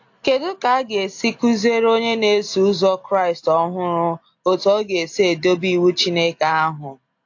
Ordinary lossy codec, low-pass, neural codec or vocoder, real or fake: AAC, 48 kbps; 7.2 kHz; none; real